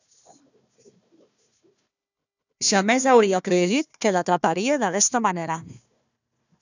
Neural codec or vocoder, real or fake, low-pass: codec, 16 kHz, 1 kbps, FunCodec, trained on Chinese and English, 50 frames a second; fake; 7.2 kHz